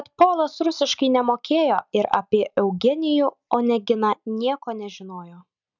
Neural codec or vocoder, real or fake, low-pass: none; real; 7.2 kHz